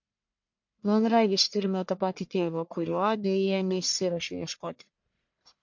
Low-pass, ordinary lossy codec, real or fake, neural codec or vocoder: 7.2 kHz; MP3, 48 kbps; fake; codec, 44.1 kHz, 1.7 kbps, Pupu-Codec